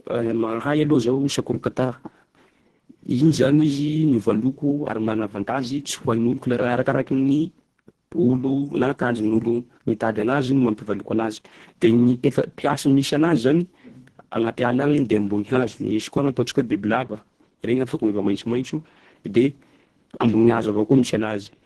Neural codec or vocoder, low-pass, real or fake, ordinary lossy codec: codec, 24 kHz, 1.5 kbps, HILCodec; 10.8 kHz; fake; Opus, 16 kbps